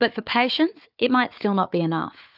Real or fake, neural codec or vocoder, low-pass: fake; codec, 16 kHz, 4 kbps, FunCodec, trained on Chinese and English, 50 frames a second; 5.4 kHz